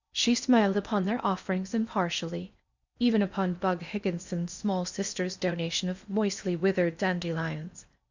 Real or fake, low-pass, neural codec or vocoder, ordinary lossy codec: fake; 7.2 kHz; codec, 16 kHz in and 24 kHz out, 0.8 kbps, FocalCodec, streaming, 65536 codes; Opus, 64 kbps